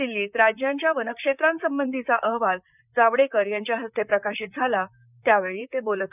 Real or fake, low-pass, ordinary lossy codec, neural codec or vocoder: fake; 3.6 kHz; none; vocoder, 44.1 kHz, 128 mel bands, Pupu-Vocoder